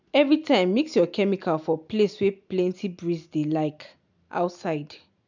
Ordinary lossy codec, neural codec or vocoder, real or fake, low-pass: none; none; real; 7.2 kHz